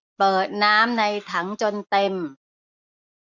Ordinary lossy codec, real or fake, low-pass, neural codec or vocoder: MP3, 64 kbps; real; 7.2 kHz; none